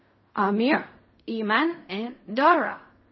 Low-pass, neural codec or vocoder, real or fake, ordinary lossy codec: 7.2 kHz; codec, 16 kHz in and 24 kHz out, 0.4 kbps, LongCat-Audio-Codec, fine tuned four codebook decoder; fake; MP3, 24 kbps